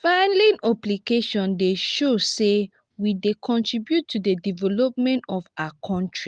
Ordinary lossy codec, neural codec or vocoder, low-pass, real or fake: Opus, 24 kbps; none; 9.9 kHz; real